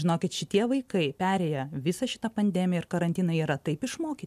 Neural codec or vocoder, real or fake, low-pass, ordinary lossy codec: none; real; 14.4 kHz; MP3, 96 kbps